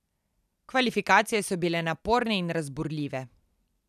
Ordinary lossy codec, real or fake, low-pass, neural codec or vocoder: none; fake; 14.4 kHz; vocoder, 44.1 kHz, 128 mel bands every 256 samples, BigVGAN v2